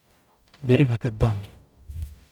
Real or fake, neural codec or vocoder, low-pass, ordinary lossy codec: fake; codec, 44.1 kHz, 0.9 kbps, DAC; 19.8 kHz; none